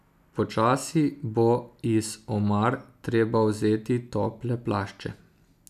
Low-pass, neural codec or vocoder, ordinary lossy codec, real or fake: 14.4 kHz; none; none; real